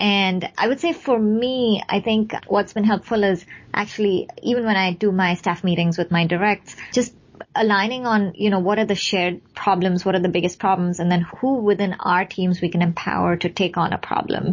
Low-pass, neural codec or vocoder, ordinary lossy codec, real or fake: 7.2 kHz; none; MP3, 32 kbps; real